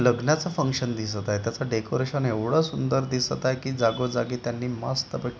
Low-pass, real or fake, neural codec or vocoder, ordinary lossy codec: none; real; none; none